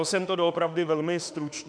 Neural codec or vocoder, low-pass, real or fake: autoencoder, 48 kHz, 32 numbers a frame, DAC-VAE, trained on Japanese speech; 9.9 kHz; fake